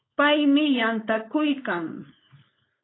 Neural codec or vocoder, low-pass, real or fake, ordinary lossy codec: codec, 16 kHz, 4.8 kbps, FACodec; 7.2 kHz; fake; AAC, 16 kbps